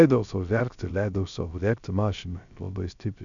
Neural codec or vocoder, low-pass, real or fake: codec, 16 kHz, 0.3 kbps, FocalCodec; 7.2 kHz; fake